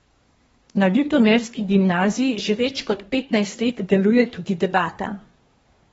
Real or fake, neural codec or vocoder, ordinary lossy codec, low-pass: fake; codec, 24 kHz, 1 kbps, SNAC; AAC, 24 kbps; 10.8 kHz